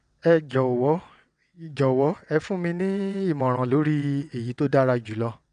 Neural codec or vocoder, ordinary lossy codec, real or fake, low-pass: vocoder, 22.05 kHz, 80 mel bands, WaveNeXt; MP3, 96 kbps; fake; 9.9 kHz